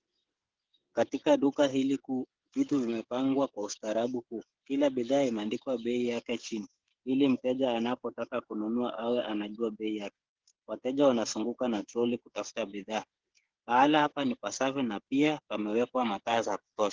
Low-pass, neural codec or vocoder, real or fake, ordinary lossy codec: 7.2 kHz; codec, 16 kHz, 8 kbps, FreqCodec, smaller model; fake; Opus, 16 kbps